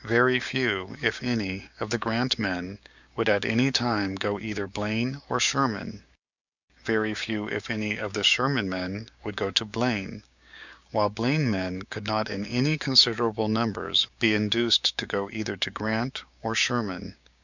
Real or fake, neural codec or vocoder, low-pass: fake; codec, 16 kHz, 6 kbps, DAC; 7.2 kHz